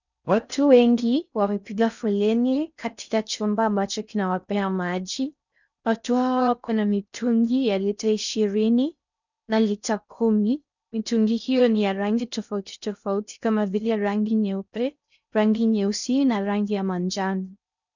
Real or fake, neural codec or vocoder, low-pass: fake; codec, 16 kHz in and 24 kHz out, 0.6 kbps, FocalCodec, streaming, 4096 codes; 7.2 kHz